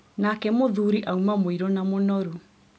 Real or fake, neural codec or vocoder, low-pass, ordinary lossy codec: real; none; none; none